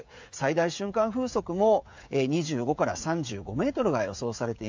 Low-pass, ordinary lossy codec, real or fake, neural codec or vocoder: 7.2 kHz; MP3, 64 kbps; fake; codec, 16 kHz, 16 kbps, FreqCodec, smaller model